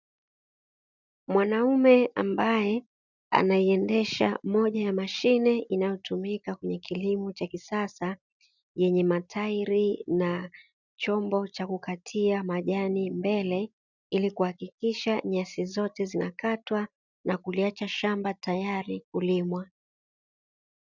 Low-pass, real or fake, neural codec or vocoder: 7.2 kHz; real; none